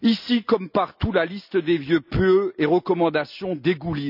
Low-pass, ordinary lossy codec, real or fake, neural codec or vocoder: 5.4 kHz; none; real; none